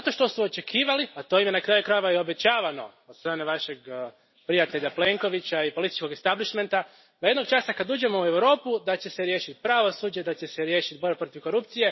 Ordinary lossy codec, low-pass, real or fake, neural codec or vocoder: MP3, 24 kbps; 7.2 kHz; real; none